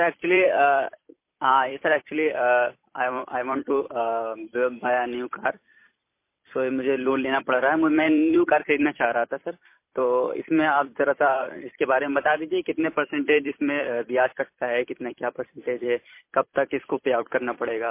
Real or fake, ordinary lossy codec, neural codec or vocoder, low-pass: fake; MP3, 24 kbps; vocoder, 44.1 kHz, 128 mel bands every 256 samples, BigVGAN v2; 3.6 kHz